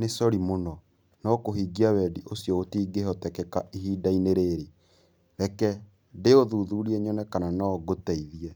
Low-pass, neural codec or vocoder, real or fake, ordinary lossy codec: none; none; real; none